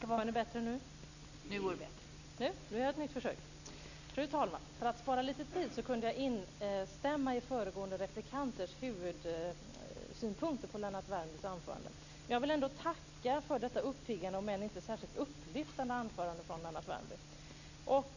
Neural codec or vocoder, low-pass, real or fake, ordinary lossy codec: none; 7.2 kHz; real; none